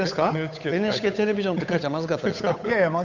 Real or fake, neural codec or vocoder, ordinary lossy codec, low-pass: fake; codec, 16 kHz, 8 kbps, FunCodec, trained on Chinese and English, 25 frames a second; none; 7.2 kHz